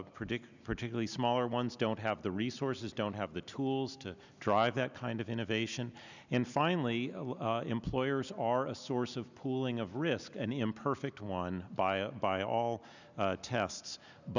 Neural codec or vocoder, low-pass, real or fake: none; 7.2 kHz; real